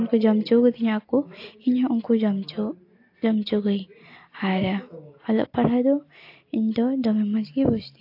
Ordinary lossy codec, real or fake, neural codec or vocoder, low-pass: AAC, 32 kbps; real; none; 5.4 kHz